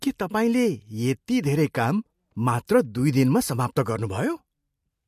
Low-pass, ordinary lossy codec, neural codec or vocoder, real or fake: 14.4 kHz; MP3, 64 kbps; none; real